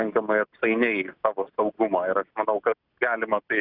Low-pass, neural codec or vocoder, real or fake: 5.4 kHz; none; real